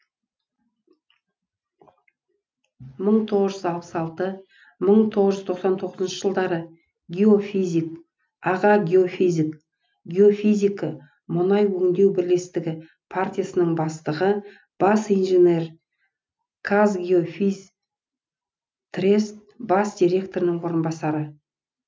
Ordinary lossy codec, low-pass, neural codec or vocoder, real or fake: none; none; none; real